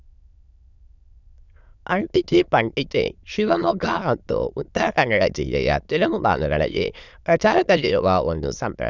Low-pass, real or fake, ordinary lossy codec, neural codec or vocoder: 7.2 kHz; fake; none; autoencoder, 22.05 kHz, a latent of 192 numbers a frame, VITS, trained on many speakers